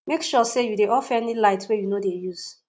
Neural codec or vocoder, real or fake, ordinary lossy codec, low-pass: none; real; none; none